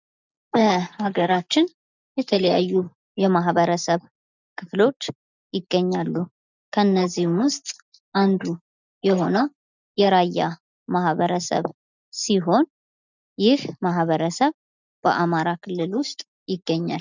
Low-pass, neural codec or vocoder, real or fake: 7.2 kHz; none; real